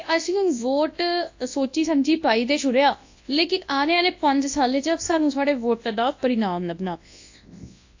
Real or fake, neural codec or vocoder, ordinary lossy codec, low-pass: fake; codec, 24 kHz, 0.9 kbps, WavTokenizer, large speech release; AAC, 48 kbps; 7.2 kHz